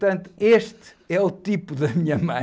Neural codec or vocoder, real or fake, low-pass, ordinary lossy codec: none; real; none; none